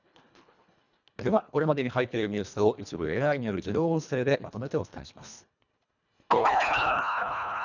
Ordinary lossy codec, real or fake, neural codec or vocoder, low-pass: none; fake; codec, 24 kHz, 1.5 kbps, HILCodec; 7.2 kHz